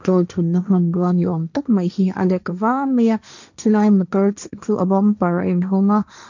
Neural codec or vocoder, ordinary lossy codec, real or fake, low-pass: codec, 16 kHz, 1.1 kbps, Voila-Tokenizer; none; fake; 7.2 kHz